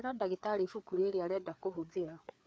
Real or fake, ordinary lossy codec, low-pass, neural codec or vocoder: fake; none; none; codec, 16 kHz, 4 kbps, FreqCodec, larger model